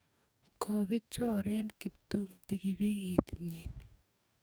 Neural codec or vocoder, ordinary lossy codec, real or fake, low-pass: codec, 44.1 kHz, 2.6 kbps, DAC; none; fake; none